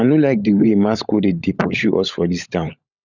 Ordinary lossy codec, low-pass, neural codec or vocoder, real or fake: none; 7.2 kHz; codec, 16 kHz, 16 kbps, FunCodec, trained on LibriTTS, 50 frames a second; fake